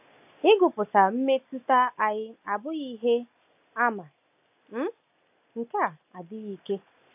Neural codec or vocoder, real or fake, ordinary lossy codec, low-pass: none; real; AAC, 32 kbps; 3.6 kHz